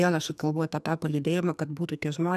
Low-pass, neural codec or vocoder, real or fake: 14.4 kHz; codec, 44.1 kHz, 2.6 kbps, SNAC; fake